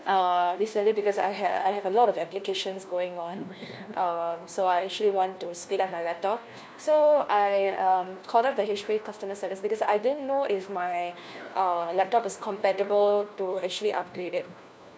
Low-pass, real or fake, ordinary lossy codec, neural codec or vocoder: none; fake; none; codec, 16 kHz, 1 kbps, FunCodec, trained on LibriTTS, 50 frames a second